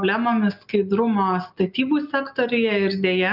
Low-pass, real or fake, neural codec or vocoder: 5.4 kHz; real; none